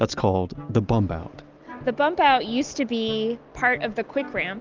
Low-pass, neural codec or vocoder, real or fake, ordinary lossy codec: 7.2 kHz; none; real; Opus, 24 kbps